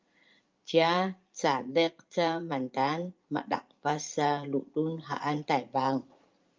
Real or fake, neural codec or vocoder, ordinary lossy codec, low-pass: fake; vocoder, 44.1 kHz, 80 mel bands, Vocos; Opus, 24 kbps; 7.2 kHz